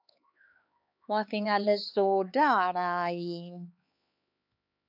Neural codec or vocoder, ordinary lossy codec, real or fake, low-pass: codec, 16 kHz, 4 kbps, X-Codec, HuBERT features, trained on LibriSpeech; AAC, 48 kbps; fake; 5.4 kHz